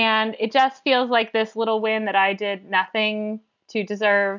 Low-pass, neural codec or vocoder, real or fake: 7.2 kHz; none; real